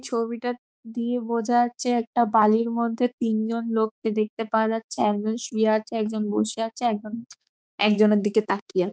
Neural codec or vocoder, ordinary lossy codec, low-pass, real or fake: codec, 16 kHz, 4 kbps, X-Codec, HuBERT features, trained on balanced general audio; none; none; fake